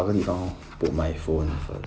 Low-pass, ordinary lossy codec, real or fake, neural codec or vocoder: none; none; real; none